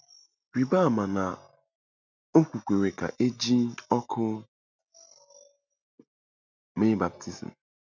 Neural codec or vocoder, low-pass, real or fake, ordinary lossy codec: none; 7.2 kHz; real; none